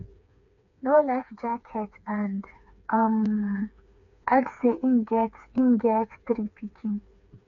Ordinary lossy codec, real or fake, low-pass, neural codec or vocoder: none; fake; 7.2 kHz; codec, 16 kHz, 4 kbps, FreqCodec, smaller model